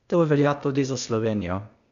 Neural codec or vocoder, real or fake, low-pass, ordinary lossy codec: codec, 16 kHz, 0.8 kbps, ZipCodec; fake; 7.2 kHz; none